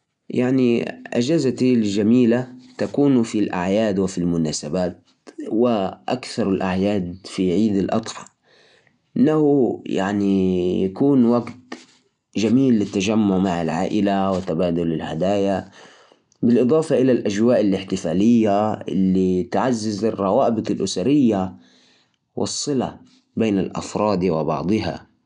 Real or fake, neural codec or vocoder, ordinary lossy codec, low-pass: real; none; none; 10.8 kHz